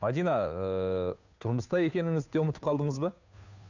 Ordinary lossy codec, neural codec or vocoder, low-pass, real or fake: none; codec, 16 kHz, 2 kbps, FunCodec, trained on Chinese and English, 25 frames a second; 7.2 kHz; fake